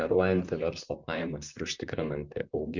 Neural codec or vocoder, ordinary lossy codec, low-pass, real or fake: vocoder, 44.1 kHz, 128 mel bands, Pupu-Vocoder; Opus, 64 kbps; 7.2 kHz; fake